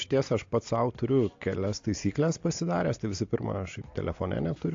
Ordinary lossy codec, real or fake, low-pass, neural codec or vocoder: AAC, 48 kbps; real; 7.2 kHz; none